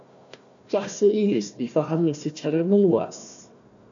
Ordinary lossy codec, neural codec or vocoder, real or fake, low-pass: MP3, 64 kbps; codec, 16 kHz, 1 kbps, FunCodec, trained on Chinese and English, 50 frames a second; fake; 7.2 kHz